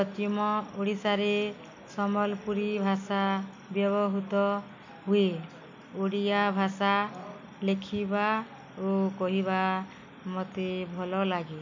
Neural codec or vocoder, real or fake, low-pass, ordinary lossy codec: none; real; 7.2 kHz; MP3, 64 kbps